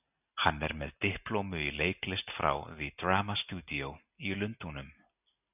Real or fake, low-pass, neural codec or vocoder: real; 3.6 kHz; none